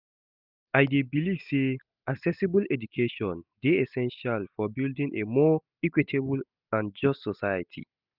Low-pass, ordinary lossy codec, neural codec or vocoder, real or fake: 5.4 kHz; none; none; real